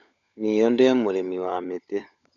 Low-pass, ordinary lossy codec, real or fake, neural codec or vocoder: 7.2 kHz; none; fake; codec, 16 kHz, 16 kbps, FunCodec, trained on LibriTTS, 50 frames a second